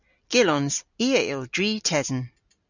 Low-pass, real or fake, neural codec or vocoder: 7.2 kHz; real; none